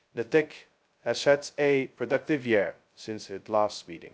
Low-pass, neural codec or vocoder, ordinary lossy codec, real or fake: none; codec, 16 kHz, 0.2 kbps, FocalCodec; none; fake